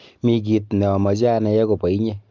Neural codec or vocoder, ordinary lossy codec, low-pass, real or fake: none; Opus, 24 kbps; 7.2 kHz; real